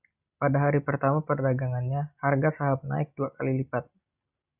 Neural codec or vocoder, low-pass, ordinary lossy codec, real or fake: none; 3.6 kHz; Opus, 64 kbps; real